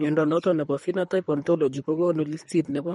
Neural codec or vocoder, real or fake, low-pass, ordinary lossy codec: codec, 24 kHz, 3 kbps, HILCodec; fake; 10.8 kHz; MP3, 48 kbps